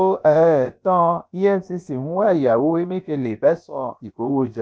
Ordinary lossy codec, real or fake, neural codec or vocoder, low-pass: none; fake; codec, 16 kHz, about 1 kbps, DyCAST, with the encoder's durations; none